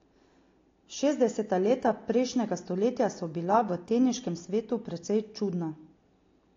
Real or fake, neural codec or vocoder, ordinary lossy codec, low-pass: real; none; AAC, 32 kbps; 7.2 kHz